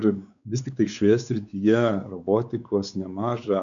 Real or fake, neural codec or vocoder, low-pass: fake; codec, 16 kHz, 4 kbps, X-Codec, WavLM features, trained on Multilingual LibriSpeech; 7.2 kHz